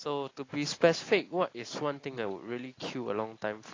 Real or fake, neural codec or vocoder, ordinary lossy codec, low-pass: real; none; AAC, 32 kbps; 7.2 kHz